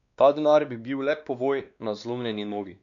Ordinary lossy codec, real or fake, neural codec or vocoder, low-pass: none; fake; codec, 16 kHz, 2 kbps, X-Codec, WavLM features, trained on Multilingual LibriSpeech; 7.2 kHz